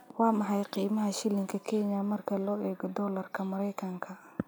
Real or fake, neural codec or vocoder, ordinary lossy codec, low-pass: real; none; none; none